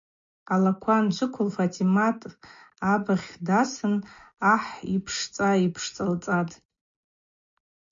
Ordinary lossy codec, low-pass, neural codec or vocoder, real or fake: MP3, 48 kbps; 7.2 kHz; none; real